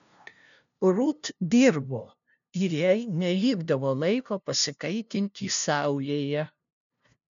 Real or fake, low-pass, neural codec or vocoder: fake; 7.2 kHz; codec, 16 kHz, 0.5 kbps, FunCodec, trained on LibriTTS, 25 frames a second